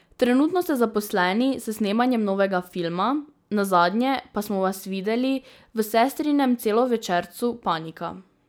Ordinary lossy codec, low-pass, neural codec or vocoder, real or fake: none; none; none; real